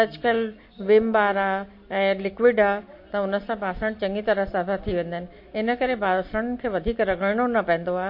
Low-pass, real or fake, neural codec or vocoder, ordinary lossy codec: 5.4 kHz; real; none; MP3, 32 kbps